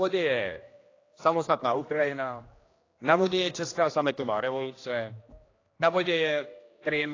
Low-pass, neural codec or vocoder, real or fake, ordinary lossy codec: 7.2 kHz; codec, 16 kHz, 1 kbps, X-Codec, HuBERT features, trained on general audio; fake; AAC, 32 kbps